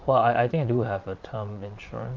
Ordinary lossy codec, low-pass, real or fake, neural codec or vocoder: Opus, 24 kbps; 7.2 kHz; fake; vocoder, 22.05 kHz, 80 mel bands, Vocos